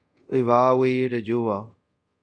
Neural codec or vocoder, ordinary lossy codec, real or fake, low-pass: codec, 24 kHz, 0.5 kbps, DualCodec; Opus, 64 kbps; fake; 9.9 kHz